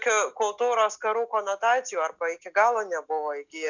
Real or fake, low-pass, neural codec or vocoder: real; 7.2 kHz; none